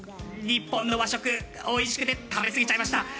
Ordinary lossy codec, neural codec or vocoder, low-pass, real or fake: none; none; none; real